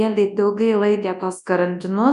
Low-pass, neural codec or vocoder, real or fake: 10.8 kHz; codec, 24 kHz, 0.9 kbps, WavTokenizer, large speech release; fake